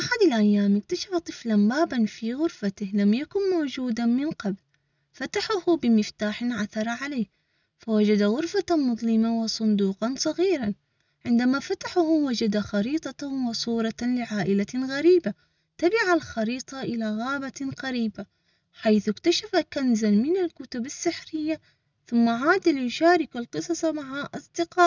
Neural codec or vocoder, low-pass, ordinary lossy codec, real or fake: none; 7.2 kHz; none; real